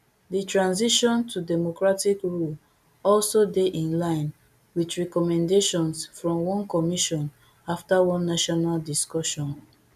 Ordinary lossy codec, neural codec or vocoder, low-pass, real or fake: none; none; 14.4 kHz; real